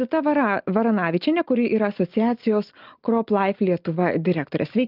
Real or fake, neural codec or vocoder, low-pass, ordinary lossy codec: real; none; 5.4 kHz; Opus, 24 kbps